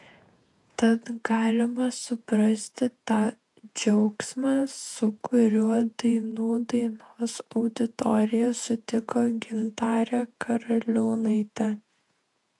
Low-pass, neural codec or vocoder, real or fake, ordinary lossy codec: 10.8 kHz; vocoder, 48 kHz, 128 mel bands, Vocos; fake; AAC, 64 kbps